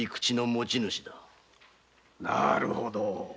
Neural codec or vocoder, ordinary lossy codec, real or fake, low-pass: none; none; real; none